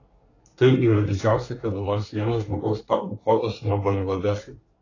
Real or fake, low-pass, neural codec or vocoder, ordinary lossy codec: fake; 7.2 kHz; codec, 24 kHz, 1 kbps, SNAC; AAC, 32 kbps